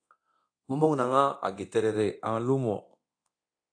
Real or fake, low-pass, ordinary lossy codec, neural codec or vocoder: fake; 9.9 kHz; AAC, 48 kbps; codec, 24 kHz, 0.9 kbps, DualCodec